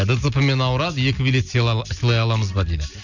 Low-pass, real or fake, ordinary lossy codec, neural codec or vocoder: 7.2 kHz; real; none; none